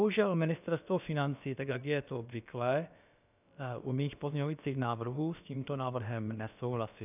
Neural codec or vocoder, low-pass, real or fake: codec, 16 kHz, about 1 kbps, DyCAST, with the encoder's durations; 3.6 kHz; fake